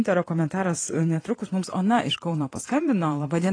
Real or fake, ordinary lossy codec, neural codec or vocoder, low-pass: fake; AAC, 32 kbps; vocoder, 22.05 kHz, 80 mel bands, Vocos; 9.9 kHz